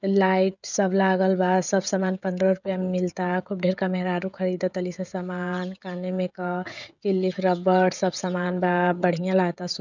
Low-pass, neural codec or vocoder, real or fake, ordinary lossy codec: 7.2 kHz; codec, 16 kHz, 16 kbps, FreqCodec, smaller model; fake; none